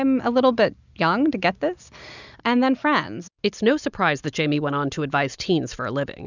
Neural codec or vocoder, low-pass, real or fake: none; 7.2 kHz; real